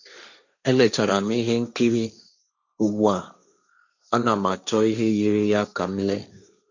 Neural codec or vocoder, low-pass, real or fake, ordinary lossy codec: codec, 16 kHz, 1.1 kbps, Voila-Tokenizer; 7.2 kHz; fake; none